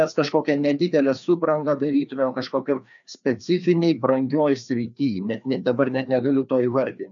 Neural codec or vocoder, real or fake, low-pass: codec, 16 kHz, 2 kbps, FreqCodec, larger model; fake; 7.2 kHz